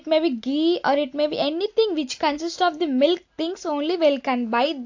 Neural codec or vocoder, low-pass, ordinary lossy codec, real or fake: none; 7.2 kHz; AAC, 48 kbps; real